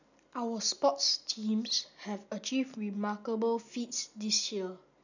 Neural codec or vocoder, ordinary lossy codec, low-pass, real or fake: none; none; 7.2 kHz; real